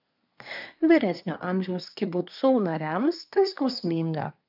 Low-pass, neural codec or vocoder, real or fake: 5.4 kHz; codec, 24 kHz, 1 kbps, SNAC; fake